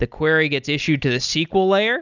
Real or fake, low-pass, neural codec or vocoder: real; 7.2 kHz; none